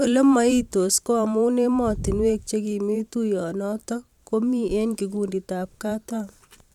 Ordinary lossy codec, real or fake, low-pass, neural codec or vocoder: none; fake; 19.8 kHz; vocoder, 44.1 kHz, 128 mel bands every 512 samples, BigVGAN v2